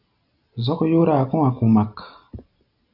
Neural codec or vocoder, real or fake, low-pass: none; real; 5.4 kHz